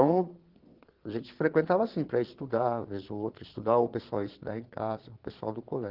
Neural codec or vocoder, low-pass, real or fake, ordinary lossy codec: vocoder, 22.05 kHz, 80 mel bands, Vocos; 5.4 kHz; fake; Opus, 16 kbps